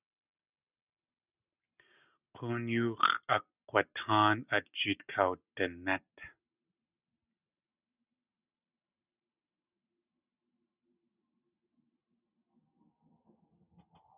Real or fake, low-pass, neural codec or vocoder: real; 3.6 kHz; none